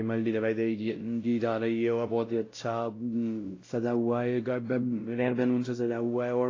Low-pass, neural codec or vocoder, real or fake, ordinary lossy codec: 7.2 kHz; codec, 16 kHz, 0.5 kbps, X-Codec, WavLM features, trained on Multilingual LibriSpeech; fake; AAC, 32 kbps